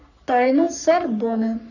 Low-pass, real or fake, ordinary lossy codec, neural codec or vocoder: 7.2 kHz; fake; none; codec, 44.1 kHz, 3.4 kbps, Pupu-Codec